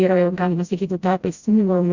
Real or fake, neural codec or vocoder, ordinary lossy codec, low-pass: fake; codec, 16 kHz, 0.5 kbps, FreqCodec, smaller model; Opus, 64 kbps; 7.2 kHz